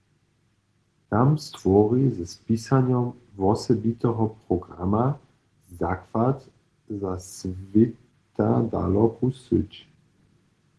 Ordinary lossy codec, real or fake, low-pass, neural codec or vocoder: Opus, 16 kbps; real; 10.8 kHz; none